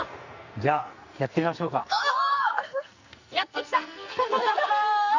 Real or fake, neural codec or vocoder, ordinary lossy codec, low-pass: fake; codec, 44.1 kHz, 2.6 kbps, SNAC; Opus, 64 kbps; 7.2 kHz